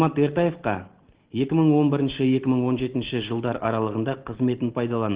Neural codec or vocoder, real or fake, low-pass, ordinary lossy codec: none; real; 3.6 kHz; Opus, 16 kbps